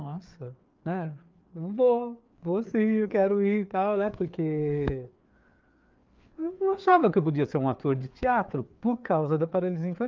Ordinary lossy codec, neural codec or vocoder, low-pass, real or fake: Opus, 24 kbps; codec, 16 kHz, 4 kbps, FreqCodec, larger model; 7.2 kHz; fake